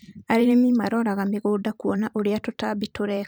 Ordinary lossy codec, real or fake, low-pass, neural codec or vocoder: none; fake; none; vocoder, 44.1 kHz, 128 mel bands every 512 samples, BigVGAN v2